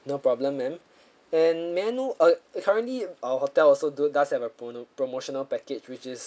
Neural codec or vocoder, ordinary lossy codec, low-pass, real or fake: none; none; none; real